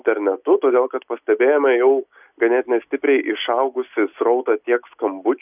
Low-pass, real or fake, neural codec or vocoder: 3.6 kHz; real; none